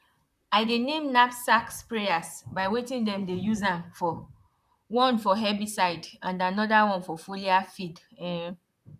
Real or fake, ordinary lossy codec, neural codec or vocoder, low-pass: fake; none; vocoder, 44.1 kHz, 128 mel bands, Pupu-Vocoder; 14.4 kHz